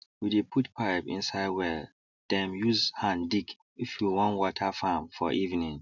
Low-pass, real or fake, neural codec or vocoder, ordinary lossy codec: 7.2 kHz; real; none; none